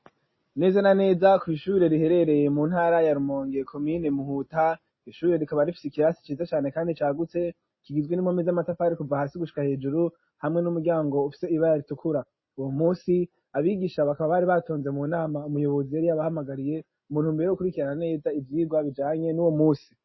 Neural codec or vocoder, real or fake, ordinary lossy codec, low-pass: none; real; MP3, 24 kbps; 7.2 kHz